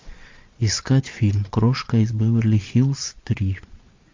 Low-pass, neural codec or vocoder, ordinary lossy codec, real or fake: 7.2 kHz; vocoder, 22.05 kHz, 80 mel bands, Vocos; MP3, 48 kbps; fake